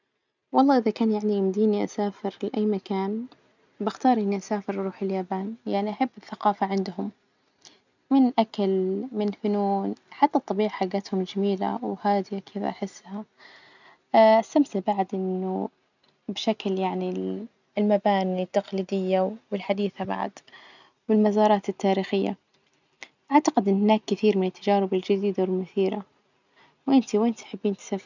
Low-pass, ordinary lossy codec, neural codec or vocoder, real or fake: 7.2 kHz; none; none; real